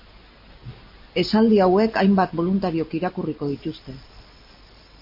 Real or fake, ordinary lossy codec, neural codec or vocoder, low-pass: real; MP3, 32 kbps; none; 5.4 kHz